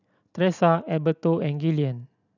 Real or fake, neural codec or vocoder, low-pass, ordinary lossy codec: real; none; 7.2 kHz; none